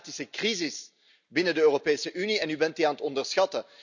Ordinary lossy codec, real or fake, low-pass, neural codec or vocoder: none; real; 7.2 kHz; none